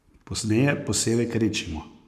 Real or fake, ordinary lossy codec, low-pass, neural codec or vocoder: fake; none; 14.4 kHz; codec, 44.1 kHz, 7.8 kbps, Pupu-Codec